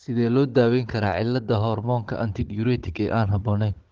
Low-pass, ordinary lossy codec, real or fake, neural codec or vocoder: 7.2 kHz; Opus, 16 kbps; fake; codec, 16 kHz, 16 kbps, FunCodec, trained on Chinese and English, 50 frames a second